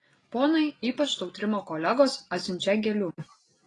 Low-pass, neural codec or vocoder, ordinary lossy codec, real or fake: 10.8 kHz; none; AAC, 32 kbps; real